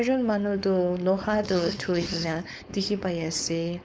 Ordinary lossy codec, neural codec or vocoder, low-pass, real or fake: none; codec, 16 kHz, 4.8 kbps, FACodec; none; fake